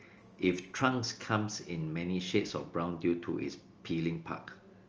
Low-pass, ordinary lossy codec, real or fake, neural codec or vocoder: 7.2 kHz; Opus, 24 kbps; real; none